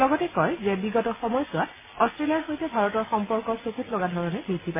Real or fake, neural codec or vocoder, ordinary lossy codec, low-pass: real; none; MP3, 16 kbps; 3.6 kHz